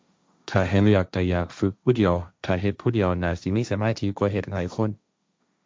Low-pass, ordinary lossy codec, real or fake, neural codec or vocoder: none; none; fake; codec, 16 kHz, 1.1 kbps, Voila-Tokenizer